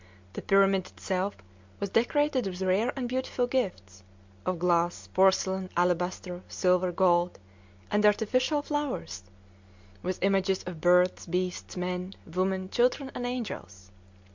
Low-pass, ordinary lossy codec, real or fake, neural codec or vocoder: 7.2 kHz; MP3, 64 kbps; real; none